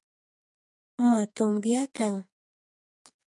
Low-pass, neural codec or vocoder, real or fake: 10.8 kHz; codec, 44.1 kHz, 2.6 kbps, SNAC; fake